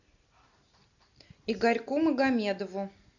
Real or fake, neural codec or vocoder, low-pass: real; none; 7.2 kHz